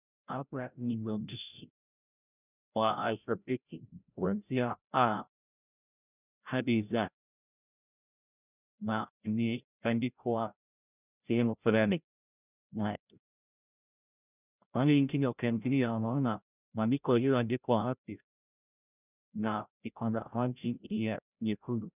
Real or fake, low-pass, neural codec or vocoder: fake; 3.6 kHz; codec, 16 kHz, 0.5 kbps, FreqCodec, larger model